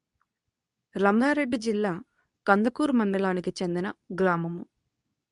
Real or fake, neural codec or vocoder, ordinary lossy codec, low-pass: fake; codec, 24 kHz, 0.9 kbps, WavTokenizer, medium speech release version 2; AAC, 96 kbps; 10.8 kHz